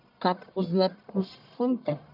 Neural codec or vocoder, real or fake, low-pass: codec, 44.1 kHz, 1.7 kbps, Pupu-Codec; fake; 5.4 kHz